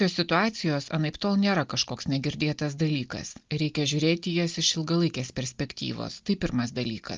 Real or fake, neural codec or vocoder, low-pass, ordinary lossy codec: real; none; 7.2 kHz; Opus, 32 kbps